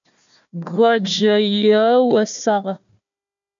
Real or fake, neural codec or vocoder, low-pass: fake; codec, 16 kHz, 1 kbps, FunCodec, trained on Chinese and English, 50 frames a second; 7.2 kHz